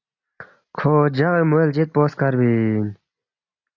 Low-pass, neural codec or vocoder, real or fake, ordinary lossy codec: 7.2 kHz; none; real; AAC, 48 kbps